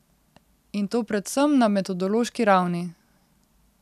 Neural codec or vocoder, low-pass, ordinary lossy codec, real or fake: none; 14.4 kHz; none; real